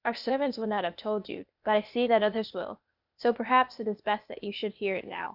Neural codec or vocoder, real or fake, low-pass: codec, 16 kHz, 0.8 kbps, ZipCodec; fake; 5.4 kHz